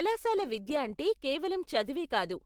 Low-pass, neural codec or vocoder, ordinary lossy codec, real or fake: 19.8 kHz; autoencoder, 48 kHz, 128 numbers a frame, DAC-VAE, trained on Japanese speech; Opus, 16 kbps; fake